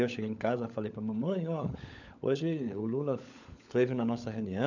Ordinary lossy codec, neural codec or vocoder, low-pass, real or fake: none; codec, 16 kHz, 16 kbps, FunCodec, trained on LibriTTS, 50 frames a second; 7.2 kHz; fake